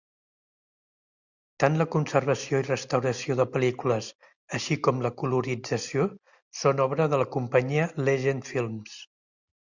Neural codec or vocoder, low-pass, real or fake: none; 7.2 kHz; real